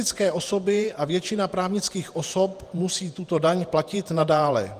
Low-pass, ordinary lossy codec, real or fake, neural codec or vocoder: 14.4 kHz; Opus, 32 kbps; fake; vocoder, 48 kHz, 128 mel bands, Vocos